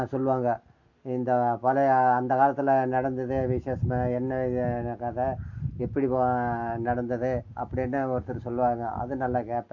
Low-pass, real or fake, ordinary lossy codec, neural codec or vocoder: 7.2 kHz; real; MP3, 48 kbps; none